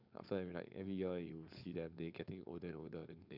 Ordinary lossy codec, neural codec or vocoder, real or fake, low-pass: none; codec, 16 kHz, 4.8 kbps, FACodec; fake; 5.4 kHz